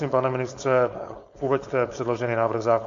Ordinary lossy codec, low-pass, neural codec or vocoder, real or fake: MP3, 48 kbps; 7.2 kHz; codec, 16 kHz, 4.8 kbps, FACodec; fake